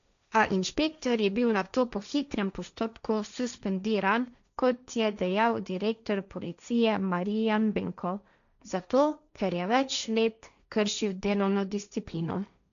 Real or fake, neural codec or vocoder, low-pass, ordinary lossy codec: fake; codec, 16 kHz, 1.1 kbps, Voila-Tokenizer; 7.2 kHz; none